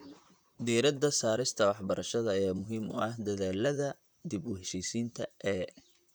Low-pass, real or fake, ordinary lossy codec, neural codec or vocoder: none; fake; none; vocoder, 44.1 kHz, 128 mel bands, Pupu-Vocoder